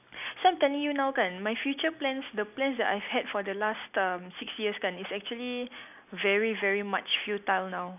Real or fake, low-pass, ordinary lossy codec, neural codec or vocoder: real; 3.6 kHz; none; none